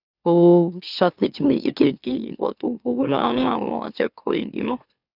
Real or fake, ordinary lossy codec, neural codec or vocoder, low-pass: fake; none; autoencoder, 44.1 kHz, a latent of 192 numbers a frame, MeloTTS; 5.4 kHz